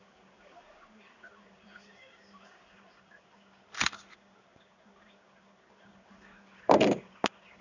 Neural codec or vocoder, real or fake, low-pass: codec, 16 kHz in and 24 kHz out, 1 kbps, XY-Tokenizer; fake; 7.2 kHz